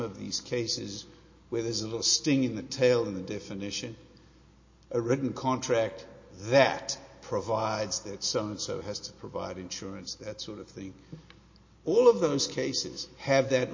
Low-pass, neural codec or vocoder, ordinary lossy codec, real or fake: 7.2 kHz; none; MP3, 32 kbps; real